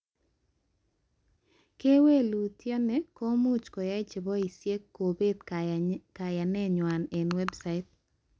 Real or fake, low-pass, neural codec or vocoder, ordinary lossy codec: real; none; none; none